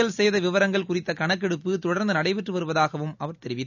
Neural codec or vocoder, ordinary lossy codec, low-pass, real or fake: none; none; 7.2 kHz; real